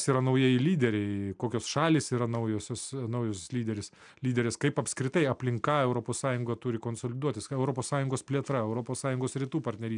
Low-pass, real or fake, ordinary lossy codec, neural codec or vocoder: 9.9 kHz; real; MP3, 96 kbps; none